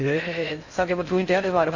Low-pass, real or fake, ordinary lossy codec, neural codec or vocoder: 7.2 kHz; fake; AAC, 48 kbps; codec, 16 kHz in and 24 kHz out, 0.6 kbps, FocalCodec, streaming, 4096 codes